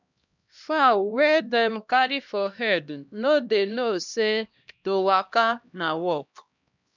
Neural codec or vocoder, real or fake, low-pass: codec, 16 kHz, 1 kbps, X-Codec, HuBERT features, trained on LibriSpeech; fake; 7.2 kHz